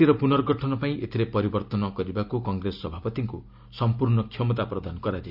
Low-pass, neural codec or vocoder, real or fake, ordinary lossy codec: 5.4 kHz; none; real; none